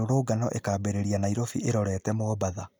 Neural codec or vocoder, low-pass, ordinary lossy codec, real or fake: none; none; none; real